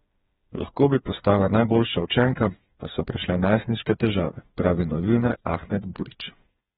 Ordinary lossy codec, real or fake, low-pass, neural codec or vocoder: AAC, 16 kbps; fake; 7.2 kHz; codec, 16 kHz, 4 kbps, FreqCodec, smaller model